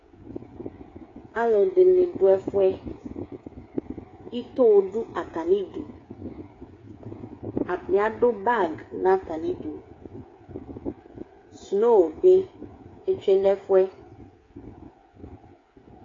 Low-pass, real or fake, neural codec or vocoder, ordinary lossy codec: 7.2 kHz; fake; codec, 16 kHz, 8 kbps, FreqCodec, smaller model; AAC, 32 kbps